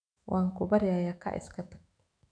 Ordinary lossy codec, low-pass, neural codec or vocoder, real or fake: none; 9.9 kHz; autoencoder, 48 kHz, 128 numbers a frame, DAC-VAE, trained on Japanese speech; fake